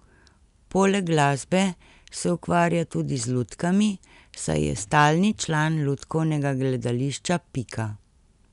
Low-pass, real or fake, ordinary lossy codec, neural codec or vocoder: 10.8 kHz; real; none; none